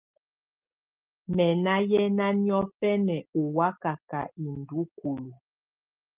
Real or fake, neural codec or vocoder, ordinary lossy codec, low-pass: real; none; Opus, 32 kbps; 3.6 kHz